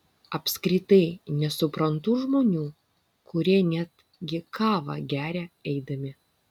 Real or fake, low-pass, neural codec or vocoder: real; 19.8 kHz; none